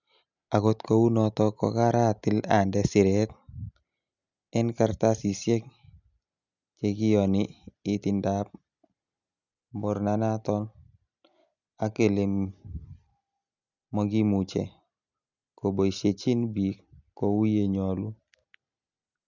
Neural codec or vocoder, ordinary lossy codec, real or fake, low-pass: none; none; real; 7.2 kHz